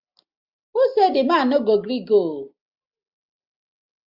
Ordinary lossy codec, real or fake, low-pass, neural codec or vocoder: MP3, 48 kbps; real; 5.4 kHz; none